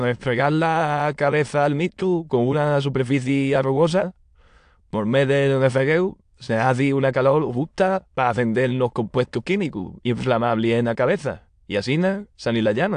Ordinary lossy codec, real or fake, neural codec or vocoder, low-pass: AAC, 64 kbps; fake; autoencoder, 22.05 kHz, a latent of 192 numbers a frame, VITS, trained on many speakers; 9.9 kHz